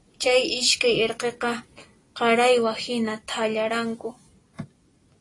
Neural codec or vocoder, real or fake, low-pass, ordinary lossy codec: none; real; 10.8 kHz; AAC, 32 kbps